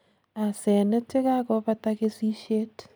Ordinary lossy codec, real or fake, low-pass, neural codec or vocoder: none; real; none; none